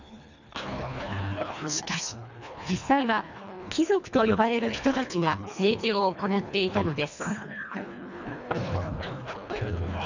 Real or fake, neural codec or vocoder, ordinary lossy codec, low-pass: fake; codec, 24 kHz, 1.5 kbps, HILCodec; none; 7.2 kHz